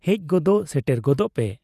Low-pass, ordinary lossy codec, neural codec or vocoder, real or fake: 14.4 kHz; none; vocoder, 48 kHz, 128 mel bands, Vocos; fake